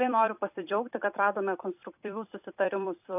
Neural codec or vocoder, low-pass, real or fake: vocoder, 44.1 kHz, 128 mel bands every 256 samples, BigVGAN v2; 3.6 kHz; fake